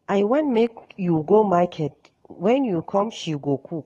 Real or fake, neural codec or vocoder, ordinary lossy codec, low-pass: fake; codec, 44.1 kHz, 7.8 kbps, DAC; AAC, 32 kbps; 19.8 kHz